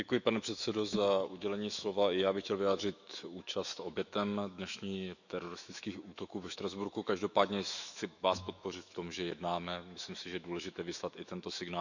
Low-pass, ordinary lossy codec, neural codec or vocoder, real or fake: 7.2 kHz; none; autoencoder, 48 kHz, 128 numbers a frame, DAC-VAE, trained on Japanese speech; fake